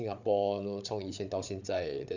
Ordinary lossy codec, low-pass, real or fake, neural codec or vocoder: none; 7.2 kHz; fake; codec, 16 kHz, 8 kbps, FreqCodec, larger model